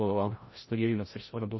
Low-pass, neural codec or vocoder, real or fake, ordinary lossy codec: 7.2 kHz; codec, 16 kHz, 0.5 kbps, FreqCodec, larger model; fake; MP3, 24 kbps